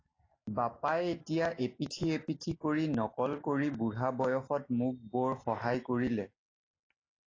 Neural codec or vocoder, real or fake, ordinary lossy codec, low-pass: none; real; AAC, 32 kbps; 7.2 kHz